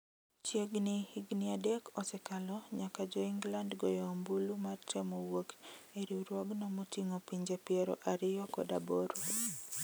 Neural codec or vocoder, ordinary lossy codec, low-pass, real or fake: none; none; none; real